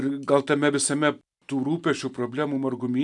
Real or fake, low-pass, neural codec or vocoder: real; 10.8 kHz; none